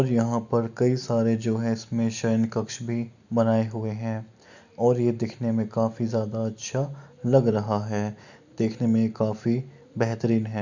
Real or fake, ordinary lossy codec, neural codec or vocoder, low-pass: real; none; none; 7.2 kHz